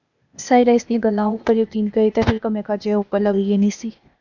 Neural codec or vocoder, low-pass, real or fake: codec, 16 kHz, 0.8 kbps, ZipCodec; 7.2 kHz; fake